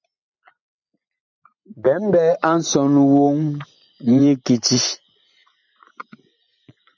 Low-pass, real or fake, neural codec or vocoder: 7.2 kHz; fake; vocoder, 24 kHz, 100 mel bands, Vocos